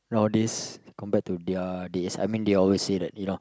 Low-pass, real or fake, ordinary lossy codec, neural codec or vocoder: none; real; none; none